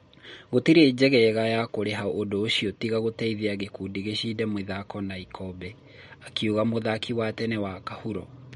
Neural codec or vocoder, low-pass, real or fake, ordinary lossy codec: none; 19.8 kHz; real; MP3, 48 kbps